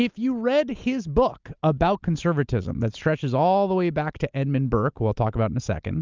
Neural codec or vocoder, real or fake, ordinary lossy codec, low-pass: none; real; Opus, 24 kbps; 7.2 kHz